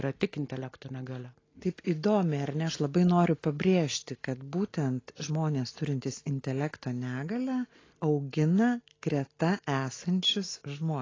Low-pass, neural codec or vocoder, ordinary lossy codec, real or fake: 7.2 kHz; none; AAC, 32 kbps; real